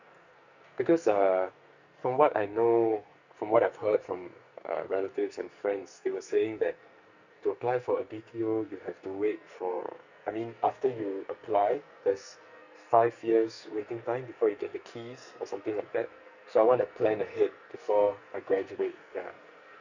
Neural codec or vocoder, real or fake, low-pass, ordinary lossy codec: codec, 44.1 kHz, 2.6 kbps, SNAC; fake; 7.2 kHz; none